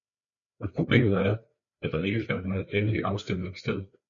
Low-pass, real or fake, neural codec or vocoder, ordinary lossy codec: 7.2 kHz; fake; codec, 16 kHz, 4 kbps, FreqCodec, larger model; MP3, 96 kbps